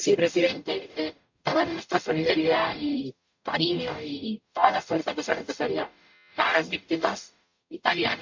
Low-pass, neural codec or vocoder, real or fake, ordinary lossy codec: 7.2 kHz; codec, 44.1 kHz, 0.9 kbps, DAC; fake; MP3, 48 kbps